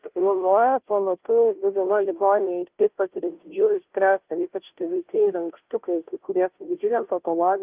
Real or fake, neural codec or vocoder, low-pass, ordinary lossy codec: fake; codec, 16 kHz, 0.5 kbps, FunCodec, trained on Chinese and English, 25 frames a second; 3.6 kHz; Opus, 32 kbps